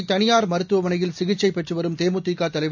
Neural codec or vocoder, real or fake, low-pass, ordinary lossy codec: none; real; 7.2 kHz; none